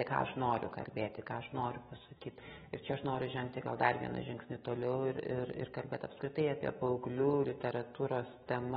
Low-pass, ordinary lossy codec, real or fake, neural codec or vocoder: 19.8 kHz; AAC, 16 kbps; fake; autoencoder, 48 kHz, 128 numbers a frame, DAC-VAE, trained on Japanese speech